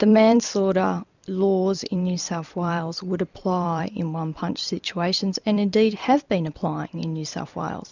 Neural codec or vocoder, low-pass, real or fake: vocoder, 44.1 kHz, 128 mel bands every 256 samples, BigVGAN v2; 7.2 kHz; fake